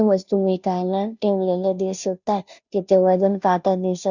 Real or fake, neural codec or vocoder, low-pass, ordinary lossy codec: fake; codec, 16 kHz, 0.5 kbps, FunCodec, trained on Chinese and English, 25 frames a second; 7.2 kHz; none